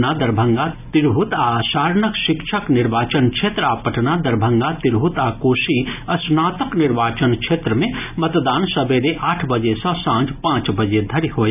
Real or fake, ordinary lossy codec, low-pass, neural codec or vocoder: real; none; 3.6 kHz; none